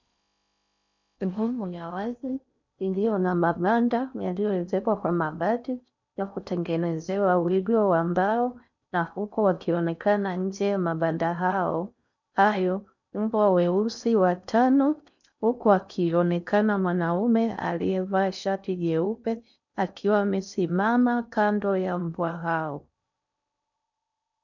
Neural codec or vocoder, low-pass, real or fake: codec, 16 kHz in and 24 kHz out, 0.6 kbps, FocalCodec, streaming, 4096 codes; 7.2 kHz; fake